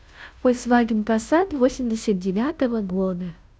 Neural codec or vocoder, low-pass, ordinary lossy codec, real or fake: codec, 16 kHz, 0.5 kbps, FunCodec, trained on Chinese and English, 25 frames a second; none; none; fake